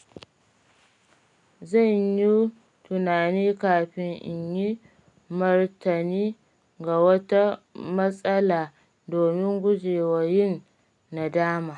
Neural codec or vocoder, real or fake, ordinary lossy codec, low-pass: none; real; none; 10.8 kHz